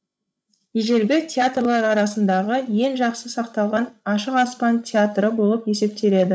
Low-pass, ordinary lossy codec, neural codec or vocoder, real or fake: none; none; codec, 16 kHz, 16 kbps, FreqCodec, larger model; fake